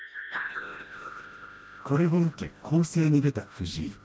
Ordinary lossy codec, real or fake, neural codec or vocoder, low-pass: none; fake; codec, 16 kHz, 1 kbps, FreqCodec, smaller model; none